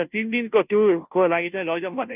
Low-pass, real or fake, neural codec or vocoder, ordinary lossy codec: 3.6 kHz; fake; codec, 16 kHz, 0.5 kbps, FunCodec, trained on Chinese and English, 25 frames a second; none